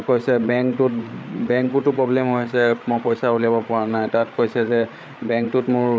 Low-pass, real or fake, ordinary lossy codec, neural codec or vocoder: none; fake; none; codec, 16 kHz, 8 kbps, FreqCodec, larger model